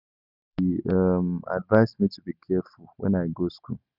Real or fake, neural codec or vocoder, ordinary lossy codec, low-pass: real; none; none; 5.4 kHz